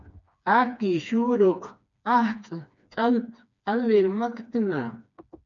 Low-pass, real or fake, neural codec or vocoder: 7.2 kHz; fake; codec, 16 kHz, 2 kbps, FreqCodec, smaller model